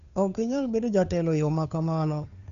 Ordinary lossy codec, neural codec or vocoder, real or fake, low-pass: none; codec, 16 kHz, 2 kbps, FunCodec, trained on Chinese and English, 25 frames a second; fake; 7.2 kHz